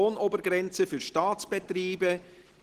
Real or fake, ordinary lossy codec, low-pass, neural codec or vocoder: real; Opus, 16 kbps; 14.4 kHz; none